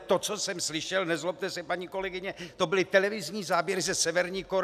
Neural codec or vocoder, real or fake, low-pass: none; real; 14.4 kHz